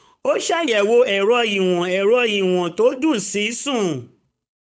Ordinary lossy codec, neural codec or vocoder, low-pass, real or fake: none; codec, 16 kHz, 8 kbps, FunCodec, trained on Chinese and English, 25 frames a second; none; fake